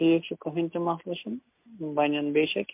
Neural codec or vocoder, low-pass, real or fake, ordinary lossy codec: none; 3.6 kHz; real; MP3, 32 kbps